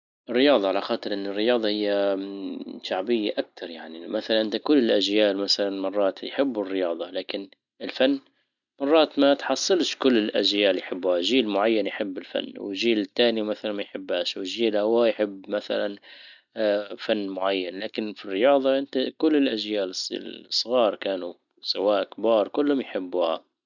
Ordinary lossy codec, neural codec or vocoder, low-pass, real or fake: none; none; 7.2 kHz; real